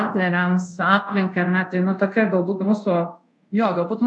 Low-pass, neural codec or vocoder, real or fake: 10.8 kHz; codec, 24 kHz, 0.5 kbps, DualCodec; fake